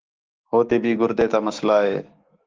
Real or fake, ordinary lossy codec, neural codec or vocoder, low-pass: fake; Opus, 16 kbps; autoencoder, 48 kHz, 128 numbers a frame, DAC-VAE, trained on Japanese speech; 7.2 kHz